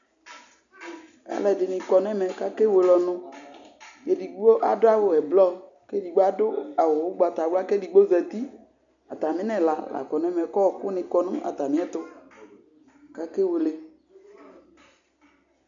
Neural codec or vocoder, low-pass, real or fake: none; 7.2 kHz; real